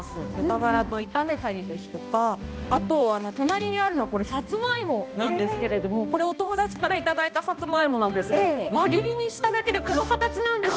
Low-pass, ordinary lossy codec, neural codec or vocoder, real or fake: none; none; codec, 16 kHz, 1 kbps, X-Codec, HuBERT features, trained on balanced general audio; fake